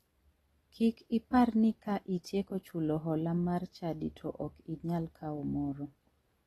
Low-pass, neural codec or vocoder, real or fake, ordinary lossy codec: 19.8 kHz; none; real; AAC, 32 kbps